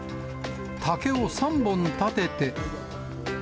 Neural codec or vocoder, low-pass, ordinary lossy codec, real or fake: none; none; none; real